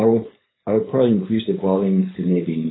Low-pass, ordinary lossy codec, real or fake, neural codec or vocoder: 7.2 kHz; AAC, 16 kbps; fake; codec, 16 kHz, 4.8 kbps, FACodec